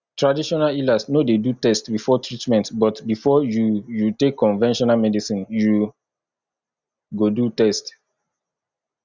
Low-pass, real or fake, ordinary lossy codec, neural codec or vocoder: 7.2 kHz; real; Opus, 64 kbps; none